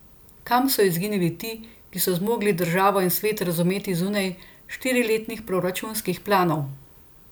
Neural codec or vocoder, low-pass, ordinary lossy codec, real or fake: none; none; none; real